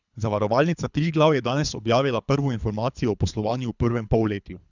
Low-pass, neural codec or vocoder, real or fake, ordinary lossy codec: 7.2 kHz; codec, 24 kHz, 3 kbps, HILCodec; fake; none